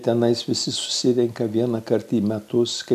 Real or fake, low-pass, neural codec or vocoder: real; 14.4 kHz; none